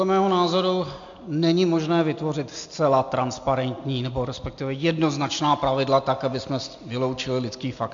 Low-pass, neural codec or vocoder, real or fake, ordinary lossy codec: 7.2 kHz; none; real; MP3, 64 kbps